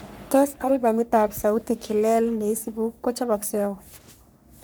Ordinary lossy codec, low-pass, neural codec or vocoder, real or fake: none; none; codec, 44.1 kHz, 3.4 kbps, Pupu-Codec; fake